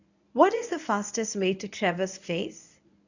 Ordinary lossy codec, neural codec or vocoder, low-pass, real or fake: none; codec, 24 kHz, 0.9 kbps, WavTokenizer, medium speech release version 1; 7.2 kHz; fake